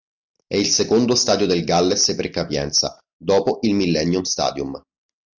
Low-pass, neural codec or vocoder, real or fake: 7.2 kHz; none; real